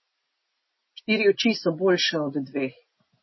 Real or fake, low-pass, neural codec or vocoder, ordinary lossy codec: real; 7.2 kHz; none; MP3, 24 kbps